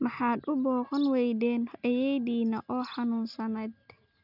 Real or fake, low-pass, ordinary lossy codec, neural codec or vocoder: real; 5.4 kHz; none; none